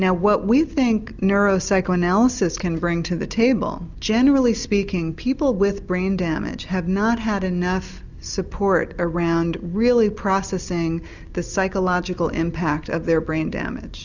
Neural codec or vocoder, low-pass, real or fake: none; 7.2 kHz; real